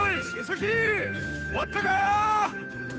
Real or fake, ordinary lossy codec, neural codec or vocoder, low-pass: fake; none; codec, 16 kHz, 2 kbps, FunCodec, trained on Chinese and English, 25 frames a second; none